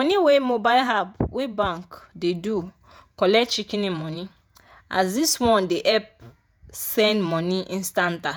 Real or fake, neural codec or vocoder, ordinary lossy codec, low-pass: fake; vocoder, 48 kHz, 128 mel bands, Vocos; none; none